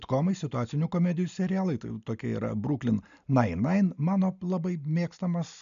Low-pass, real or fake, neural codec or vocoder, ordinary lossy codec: 7.2 kHz; real; none; MP3, 96 kbps